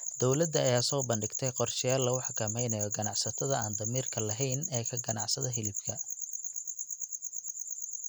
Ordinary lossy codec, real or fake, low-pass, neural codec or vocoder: none; real; none; none